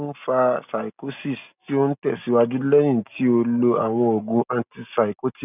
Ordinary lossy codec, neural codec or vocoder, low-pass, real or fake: none; none; 3.6 kHz; real